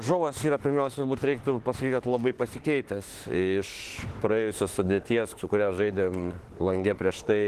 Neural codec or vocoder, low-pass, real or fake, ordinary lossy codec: autoencoder, 48 kHz, 32 numbers a frame, DAC-VAE, trained on Japanese speech; 14.4 kHz; fake; Opus, 16 kbps